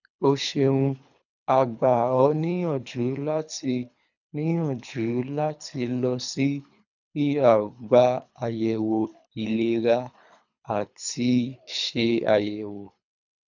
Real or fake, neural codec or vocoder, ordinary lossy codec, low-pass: fake; codec, 24 kHz, 3 kbps, HILCodec; none; 7.2 kHz